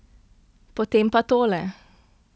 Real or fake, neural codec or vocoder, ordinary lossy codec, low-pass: real; none; none; none